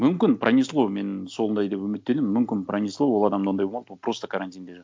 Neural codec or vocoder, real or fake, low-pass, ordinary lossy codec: none; real; none; none